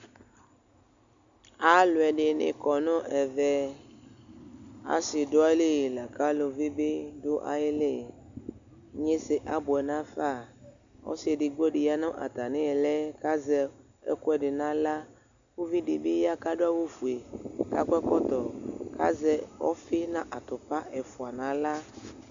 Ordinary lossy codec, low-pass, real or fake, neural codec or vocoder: MP3, 64 kbps; 7.2 kHz; real; none